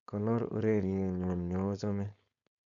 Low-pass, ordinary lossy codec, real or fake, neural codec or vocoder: 7.2 kHz; none; fake; codec, 16 kHz, 4.8 kbps, FACodec